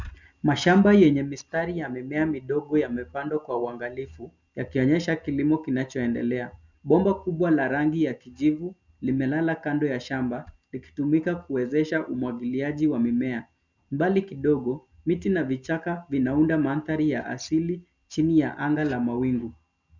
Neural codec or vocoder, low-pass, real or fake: none; 7.2 kHz; real